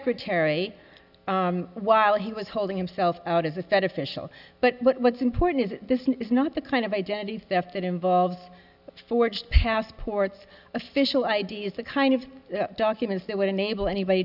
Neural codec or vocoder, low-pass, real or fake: none; 5.4 kHz; real